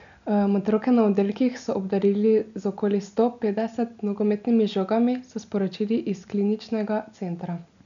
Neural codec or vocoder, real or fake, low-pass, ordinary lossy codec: none; real; 7.2 kHz; none